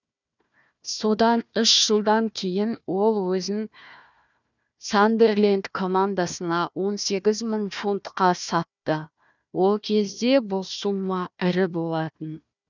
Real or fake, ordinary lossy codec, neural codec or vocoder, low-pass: fake; none; codec, 16 kHz, 1 kbps, FunCodec, trained on Chinese and English, 50 frames a second; 7.2 kHz